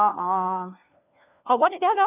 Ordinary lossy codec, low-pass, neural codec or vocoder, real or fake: none; 3.6 kHz; codec, 16 kHz, 1 kbps, FunCodec, trained on LibriTTS, 50 frames a second; fake